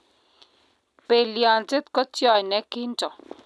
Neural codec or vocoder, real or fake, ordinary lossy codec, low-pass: none; real; none; none